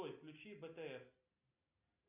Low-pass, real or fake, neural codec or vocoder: 3.6 kHz; real; none